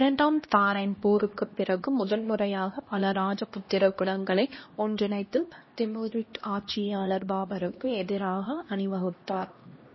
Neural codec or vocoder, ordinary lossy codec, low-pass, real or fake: codec, 16 kHz, 1 kbps, X-Codec, HuBERT features, trained on LibriSpeech; MP3, 24 kbps; 7.2 kHz; fake